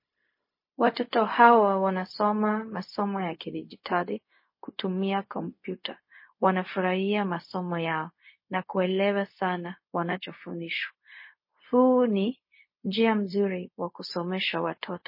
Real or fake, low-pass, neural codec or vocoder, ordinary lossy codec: fake; 5.4 kHz; codec, 16 kHz, 0.4 kbps, LongCat-Audio-Codec; MP3, 24 kbps